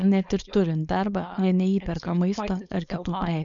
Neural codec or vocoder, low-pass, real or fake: codec, 16 kHz, 4.8 kbps, FACodec; 7.2 kHz; fake